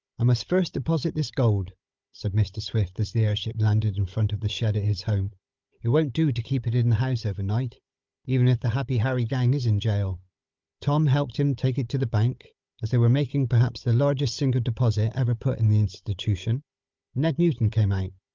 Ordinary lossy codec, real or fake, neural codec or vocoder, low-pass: Opus, 32 kbps; fake; codec, 16 kHz, 16 kbps, FunCodec, trained on Chinese and English, 50 frames a second; 7.2 kHz